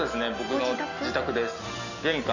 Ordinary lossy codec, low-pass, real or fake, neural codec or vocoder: none; 7.2 kHz; real; none